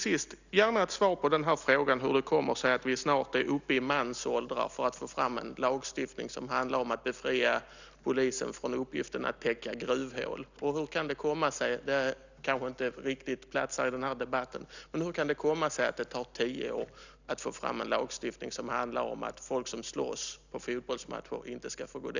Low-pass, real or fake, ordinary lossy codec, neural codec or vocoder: 7.2 kHz; real; none; none